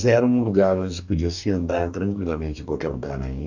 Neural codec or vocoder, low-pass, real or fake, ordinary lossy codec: codec, 44.1 kHz, 2.6 kbps, DAC; 7.2 kHz; fake; none